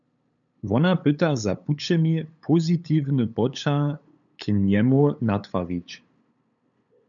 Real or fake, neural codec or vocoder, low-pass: fake; codec, 16 kHz, 8 kbps, FunCodec, trained on LibriTTS, 25 frames a second; 7.2 kHz